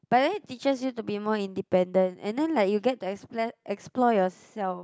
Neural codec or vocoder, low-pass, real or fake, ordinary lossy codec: none; none; real; none